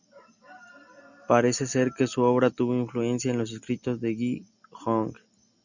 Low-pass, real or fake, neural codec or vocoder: 7.2 kHz; real; none